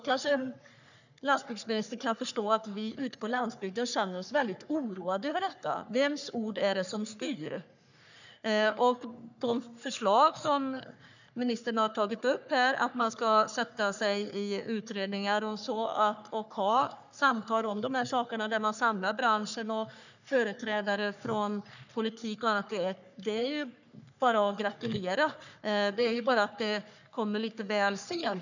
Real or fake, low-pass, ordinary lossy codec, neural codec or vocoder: fake; 7.2 kHz; none; codec, 44.1 kHz, 3.4 kbps, Pupu-Codec